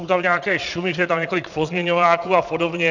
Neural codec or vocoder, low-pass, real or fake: vocoder, 22.05 kHz, 80 mel bands, Vocos; 7.2 kHz; fake